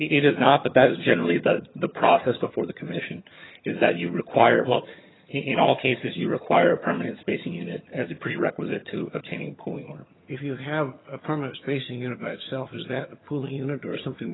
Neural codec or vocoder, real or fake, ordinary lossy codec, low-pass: vocoder, 22.05 kHz, 80 mel bands, HiFi-GAN; fake; AAC, 16 kbps; 7.2 kHz